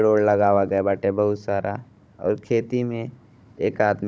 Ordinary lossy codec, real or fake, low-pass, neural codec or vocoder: none; fake; none; codec, 16 kHz, 16 kbps, FunCodec, trained on Chinese and English, 50 frames a second